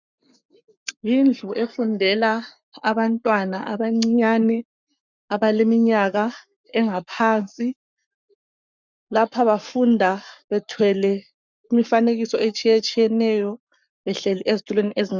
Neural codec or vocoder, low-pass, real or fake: codec, 44.1 kHz, 7.8 kbps, Pupu-Codec; 7.2 kHz; fake